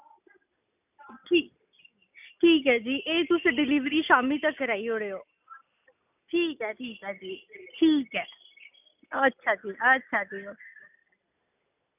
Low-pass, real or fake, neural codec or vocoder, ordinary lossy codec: 3.6 kHz; real; none; none